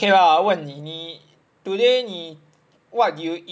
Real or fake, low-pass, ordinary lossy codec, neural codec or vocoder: real; none; none; none